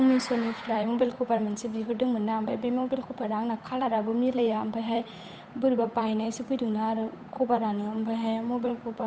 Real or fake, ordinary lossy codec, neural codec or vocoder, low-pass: fake; none; codec, 16 kHz, 8 kbps, FunCodec, trained on Chinese and English, 25 frames a second; none